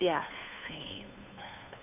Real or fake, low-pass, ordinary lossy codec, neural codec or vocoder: fake; 3.6 kHz; none; codec, 16 kHz, 2 kbps, FunCodec, trained on Chinese and English, 25 frames a second